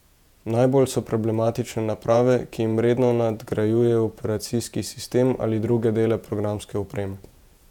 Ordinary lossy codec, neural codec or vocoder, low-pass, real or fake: none; vocoder, 48 kHz, 128 mel bands, Vocos; 19.8 kHz; fake